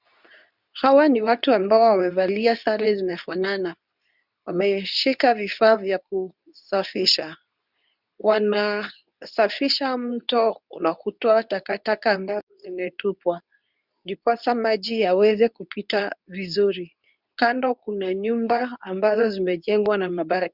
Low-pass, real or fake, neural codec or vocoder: 5.4 kHz; fake; codec, 24 kHz, 0.9 kbps, WavTokenizer, medium speech release version 2